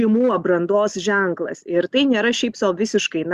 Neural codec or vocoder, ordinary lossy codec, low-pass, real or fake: none; Opus, 64 kbps; 14.4 kHz; real